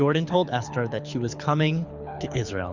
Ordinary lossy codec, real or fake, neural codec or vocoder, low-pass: Opus, 64 kbps; fake; codec, 24 kHz, 6 kbps, HILCodec; 7.2 kHz